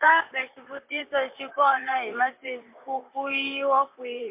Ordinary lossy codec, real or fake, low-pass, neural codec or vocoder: MP3, 32 kbps; fake; 3.6 kHz; vocoder, 44.1 kHz, 128 mel bands, Pupu-Vocoder